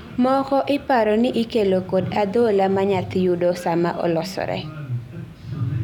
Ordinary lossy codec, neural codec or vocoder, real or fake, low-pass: none; none; real; 19.8 kHz